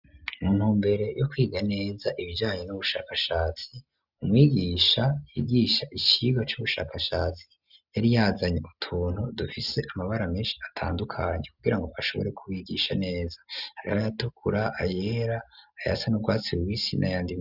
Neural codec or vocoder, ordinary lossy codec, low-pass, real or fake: none; Opus, 64 kbps; 5.4 kHz; real